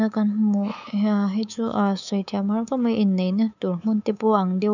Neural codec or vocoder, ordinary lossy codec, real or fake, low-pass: codec, 24 kHz, 3.1 kbps, DualCodec; none; fake; 7.2 kHz